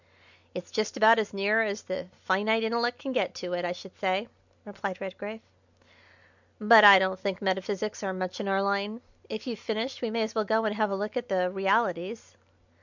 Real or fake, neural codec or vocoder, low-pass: real; none; 7.2 kHz